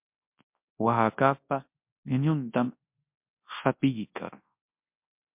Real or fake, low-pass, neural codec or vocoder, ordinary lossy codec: fake; 3.6 kHz; codec, 24 kHz, 0.9 kbps, WavTokenizer, large speech release; MP3, 32 kbps